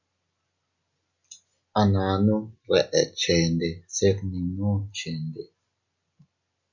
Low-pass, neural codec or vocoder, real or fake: 7.2 kHz; none; real